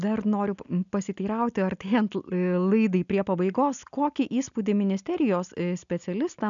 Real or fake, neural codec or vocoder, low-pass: real; none; 7.2 kHz